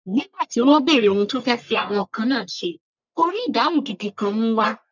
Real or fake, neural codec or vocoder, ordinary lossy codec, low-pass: fake; codec, 44.1 kHz, 1.7 kbps, Pupu-Codec; none; 7.2 kHz